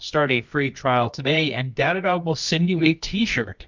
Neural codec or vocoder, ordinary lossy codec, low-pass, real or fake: codec, 24 kHz, 0.9 kbps, WavTokenizer, medium music audio release; MP3, 64 kbps; 7.2 kHz; fake